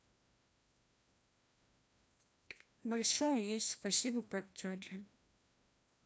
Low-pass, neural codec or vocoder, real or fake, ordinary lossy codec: none; codec, 16 kHz, 1 kbps, FreqCodec, larger model; fake; none